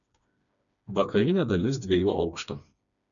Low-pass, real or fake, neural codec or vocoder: 7.2 kHz; fake; codec, 16 kHz, 2 kbps, FreqCodec, smaller model